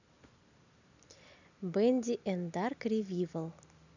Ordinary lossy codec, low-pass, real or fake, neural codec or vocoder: none; 7.2 kHz; real; none